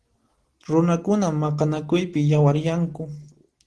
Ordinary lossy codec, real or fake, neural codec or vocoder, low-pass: Opus, 16 kbps; real; none; 10.8 kHz